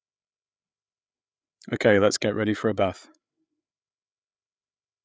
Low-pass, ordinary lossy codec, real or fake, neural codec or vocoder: none; none; fake; codec, 16 kHz, 8 kbps, FreqCodec, larger model